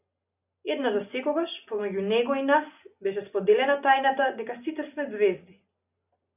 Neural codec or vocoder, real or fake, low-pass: none; real; 3.6 kHz